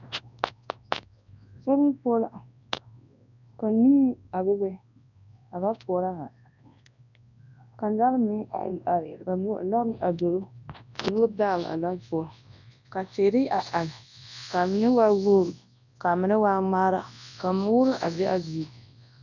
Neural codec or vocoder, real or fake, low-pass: codec, 24 kHz, 0.9 kbps, WavTokenizer, large speech release; fake; 7.2 kHz